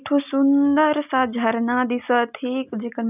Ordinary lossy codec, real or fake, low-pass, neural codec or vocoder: none; real; 3.6 kHz; none